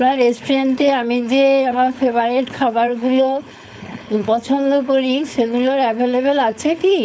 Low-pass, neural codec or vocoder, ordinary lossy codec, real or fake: none; codec, 16 kHz, 4.8 kbps, FACodec; none; fake